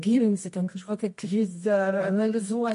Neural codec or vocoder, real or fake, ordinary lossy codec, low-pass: codec, 24 kHz, 0.9 kbps, WavTokenizer, medium music audio release; fake; MP3, 48 kbps; 10.8 kHz